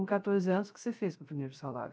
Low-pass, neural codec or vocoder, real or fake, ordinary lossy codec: none; codec, 16 kHz, 0.3 kbps, FocalCodec; fake; none